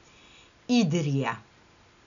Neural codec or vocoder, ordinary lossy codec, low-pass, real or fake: none; none; 7.2 kHz; real